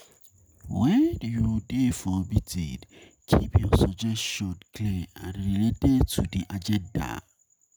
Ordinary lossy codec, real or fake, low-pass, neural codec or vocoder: none; real; none; none